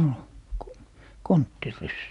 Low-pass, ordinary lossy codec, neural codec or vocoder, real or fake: 10.8 kHz; none; none; real